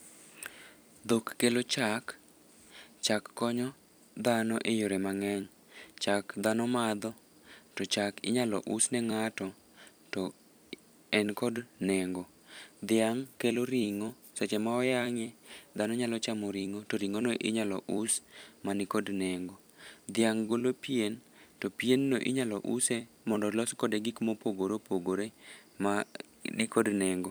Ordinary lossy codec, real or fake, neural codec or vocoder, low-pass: none; fake; vocoder, 44.1 kHz, 128 mel bands every 256 samples, BigVGAN v2; none